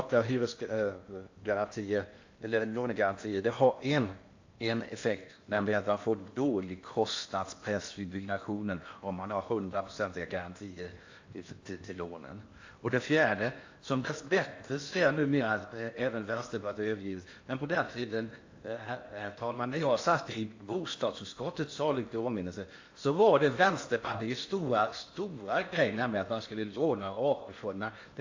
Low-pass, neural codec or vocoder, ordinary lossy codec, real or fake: 7.2 kHz; codec, 16 kHz in and 24 kHz out, 0.8 kbps, FocalCodec, streaming, 65536 codes; AAC, 48 kbps; fake